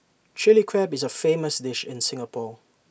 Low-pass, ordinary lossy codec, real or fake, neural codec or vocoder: none; none; real; none